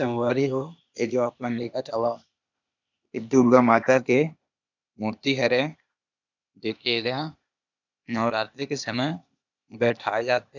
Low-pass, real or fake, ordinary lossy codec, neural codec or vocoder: 7.2 kHz; fake; none; codec, 16 kHz, 0.8 kbps, ZipCodec